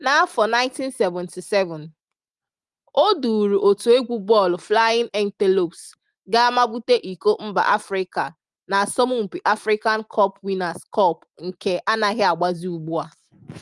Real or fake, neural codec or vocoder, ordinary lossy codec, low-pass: real; none; Opus, 32 kbps; 10.8 kHz